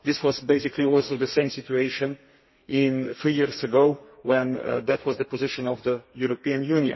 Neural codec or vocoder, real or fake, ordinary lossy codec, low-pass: codec, 32 kHz, 1.9 kbps, SNAC; fake; MP3, 24 kbps; 7.2 kHz